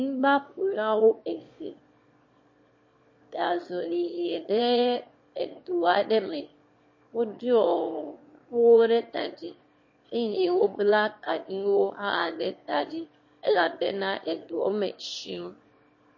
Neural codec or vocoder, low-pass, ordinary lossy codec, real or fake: autoencoder, 22.05 kHz, a latent of 192 numbers a frame, VITS, trained on one speaker; 7.2 kHz; MP3, 32 kbps; fake